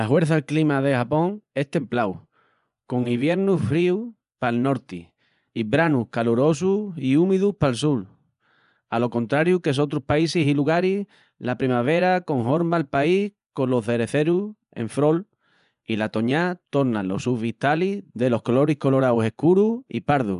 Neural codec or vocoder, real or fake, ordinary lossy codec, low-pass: vocoder, 24 kHz, 100 mel bands, Vocos; fake; none; 10.8 kHz